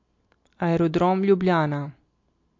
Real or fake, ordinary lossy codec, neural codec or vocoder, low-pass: real; MP3, 48 kbps; none; 7.2 kHz